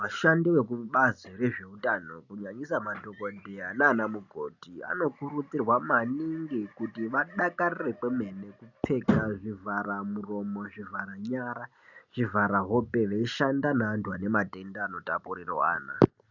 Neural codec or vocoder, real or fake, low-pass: none; real; 7.2 kHz